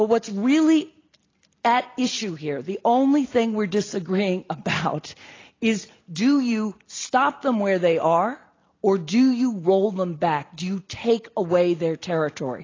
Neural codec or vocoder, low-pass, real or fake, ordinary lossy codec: none; 7.2 kHz; real; AAC, 32 kbps